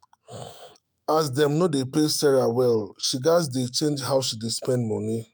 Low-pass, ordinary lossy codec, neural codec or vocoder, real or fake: none; none; autoencoder, 48 kHz, 128 numbers a frame, DAC-VAE, trained on Japanese speech; fake